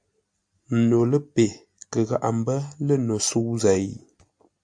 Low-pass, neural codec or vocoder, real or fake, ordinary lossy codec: 9.9 kHz; none; real; AAC, 64 kbps